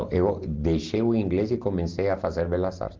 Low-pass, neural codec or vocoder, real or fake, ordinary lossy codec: 7.2 kHz; none; real; Opus, 32 kbps